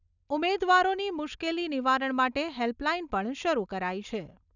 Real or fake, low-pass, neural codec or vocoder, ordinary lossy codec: real; 7.2 kHz; none; none